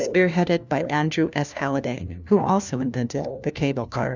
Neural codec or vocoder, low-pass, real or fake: codec, 16 kHz, 1 kbps, FunCodec, trained on LibriTTS, 50 frames a second; 7.2 kHz; fake